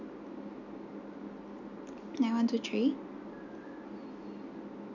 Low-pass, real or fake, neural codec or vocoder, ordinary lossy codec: 7.2 kHz; real; none; none